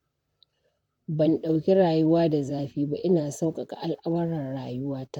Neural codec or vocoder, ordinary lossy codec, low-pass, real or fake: vocoder, 44.1 kHz, 128 mel bands, Pupu-Vocoder; MP3, 96 kbps; 19.8 kHz; fake